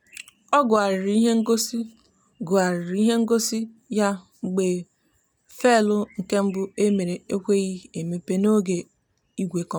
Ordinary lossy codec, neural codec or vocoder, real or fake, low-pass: none; none; real; none